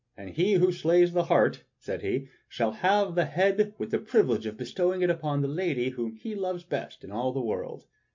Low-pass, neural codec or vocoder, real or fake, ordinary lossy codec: 7.2 kHz; none; real; MP3, 48 kbps